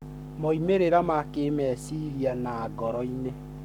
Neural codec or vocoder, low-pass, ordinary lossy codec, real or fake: codec, 44.1 kHz, 7.8 kbps, Pupu-Codec; 19.8 kHz; none; fake